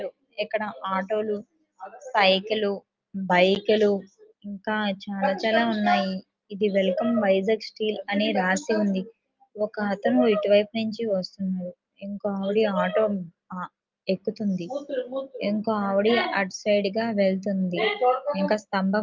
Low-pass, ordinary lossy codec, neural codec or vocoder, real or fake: 7.2 kHz; Opus, 24 kbps; none; real